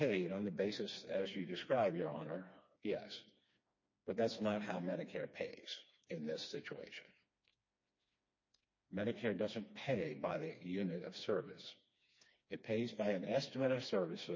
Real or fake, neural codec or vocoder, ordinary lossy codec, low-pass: fake; codec, 16 kHz, 2 kbps, FreqCodec, smaller model; MP3, 32 kbps; 7.2 kHz